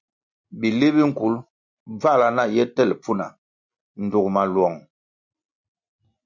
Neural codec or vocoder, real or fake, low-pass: none; real; 7.2 kHz